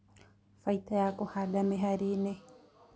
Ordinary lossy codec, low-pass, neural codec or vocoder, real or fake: none; none; none; real